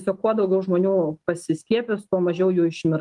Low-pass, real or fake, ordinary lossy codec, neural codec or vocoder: 10.8 kHz; real; Opus, 24 kbps; none